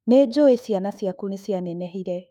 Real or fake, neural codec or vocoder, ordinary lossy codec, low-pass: fake; autoencoder, 48 kHz, 32 numbers a frame, DAC-VAE, trained on Japanese speech; none; 19.8 kHz